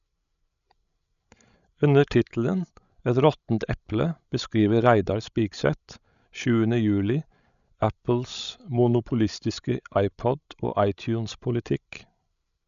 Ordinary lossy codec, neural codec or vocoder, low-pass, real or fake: none; codec, 16 kHz, 16 kbps, FreqCodec, larger model; 7.2 kHz; fake